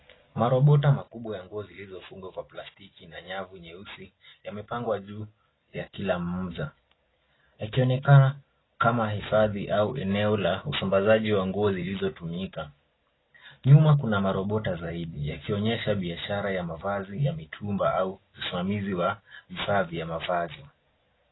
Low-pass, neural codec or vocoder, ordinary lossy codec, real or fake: 7.2 kHz; none; AAC, 16 kbps; real